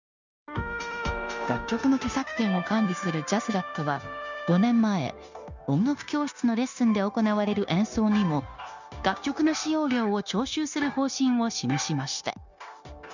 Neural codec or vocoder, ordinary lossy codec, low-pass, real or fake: codec, 16 kHz, 0.9 kbps, LongCat-Audio-Codec; none; 7.2 kHz; fake